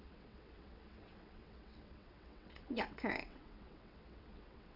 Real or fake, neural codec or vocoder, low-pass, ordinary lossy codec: fake; vocoder, 22.05 kHz, 80 mel bands, WaveNeXt; 5.4 kHz; none